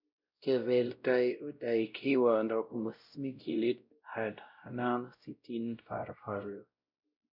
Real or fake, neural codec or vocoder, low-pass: fake; codec, 16 kHz, 0.5 kbps, X-Codec, WavLM features, trained on Multilingual LibriSpeech; 5.4 kHz